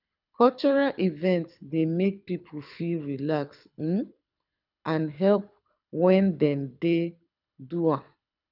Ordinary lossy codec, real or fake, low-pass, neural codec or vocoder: none; fake; 5.4 kHz; codec, 24 kHz, 6 kbps, HILCodec